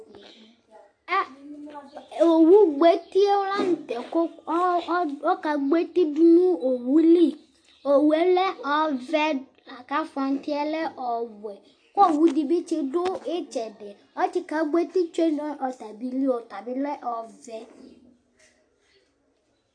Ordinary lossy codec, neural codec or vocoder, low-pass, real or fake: AAC, 48 kbps; none; 9.9 kHz; real